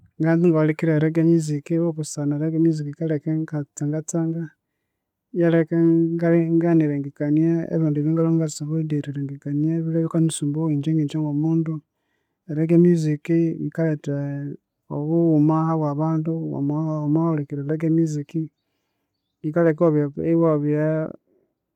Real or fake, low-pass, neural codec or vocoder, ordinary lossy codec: real; 19.8 kHz; none; none